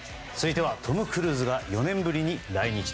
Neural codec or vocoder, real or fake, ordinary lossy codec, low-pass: none; real; none; none